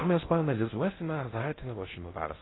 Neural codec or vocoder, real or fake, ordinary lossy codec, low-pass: codec, 16 kHz in and 24 kHz out, 0.6 kbps, FocalCodec, streaming, 2048 codes; fake; AAC, 16 kbps; 7.2 kHz